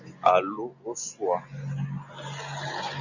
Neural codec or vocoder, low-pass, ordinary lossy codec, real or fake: none; 7.2 kHz; Opus, 64 kbps; real